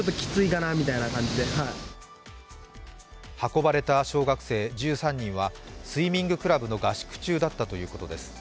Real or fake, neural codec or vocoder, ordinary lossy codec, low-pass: real; none; none; none